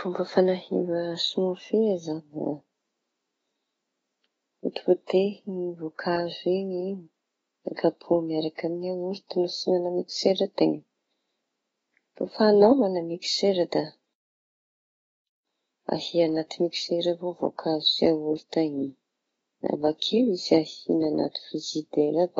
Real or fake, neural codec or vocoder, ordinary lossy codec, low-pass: fake; autoencoder, 48 kHz, 128 numbers a frame, DAC-VAE, trained on Japanese speech; AAC, 24 kbps; 19.8 kHz